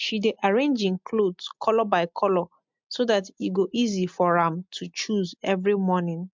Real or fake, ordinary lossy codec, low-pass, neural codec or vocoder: real; MP3, 64 kbps; 7.2 kHz; none